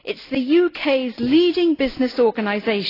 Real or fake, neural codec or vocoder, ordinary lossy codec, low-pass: real; none; AAC, 24 kbps; 5.4 kHz